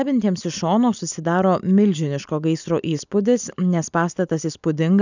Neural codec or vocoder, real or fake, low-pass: none; real; 7.2 kHz